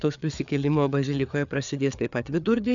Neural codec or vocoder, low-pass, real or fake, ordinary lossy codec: codec, 16 kHz, 4 kbps, FreqCodec, larger model; 7.2 kHz; fake; MP3, 96 kbps